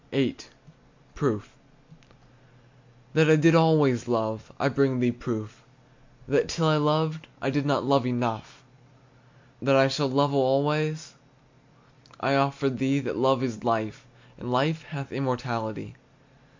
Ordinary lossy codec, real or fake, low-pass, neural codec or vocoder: MP3, 64 kbps; real; 7.2 kHz; none